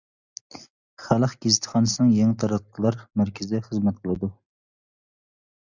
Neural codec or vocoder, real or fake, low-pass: none; real; 7.2 kHz